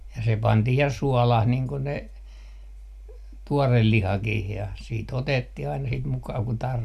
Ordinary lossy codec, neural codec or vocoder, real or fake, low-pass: MP3, 96 kbps; none; real; 14.4 kHz